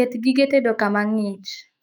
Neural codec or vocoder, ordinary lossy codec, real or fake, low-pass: autoencoder, 48 kHz, 128 numbers a frame, DAC-VAE, trained on Japanese speech; none; fake; 19.8 kHz